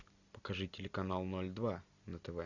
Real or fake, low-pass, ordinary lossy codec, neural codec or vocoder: real; 7.2 kHz; Opus, 64 kbps; none